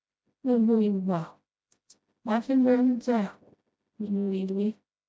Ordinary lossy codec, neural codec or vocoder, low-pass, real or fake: none; codec, 16 kHz, 0.5 kbps, FreqCodec, smaller model; none; fake